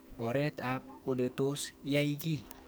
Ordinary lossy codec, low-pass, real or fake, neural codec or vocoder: none; none; fake; codec, 44.1 kHz, 2.6 kbps, SNAC